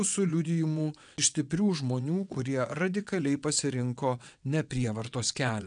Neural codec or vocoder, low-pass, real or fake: vocoder, 22.05 kHz, 80 mel bands, WaveNeXt; 9.9 kHz; fake